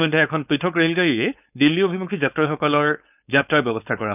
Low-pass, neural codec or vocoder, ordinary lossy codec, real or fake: 3.6 kHz; codec, 16 kHz, 4.8 kbps, FACodec; none; fake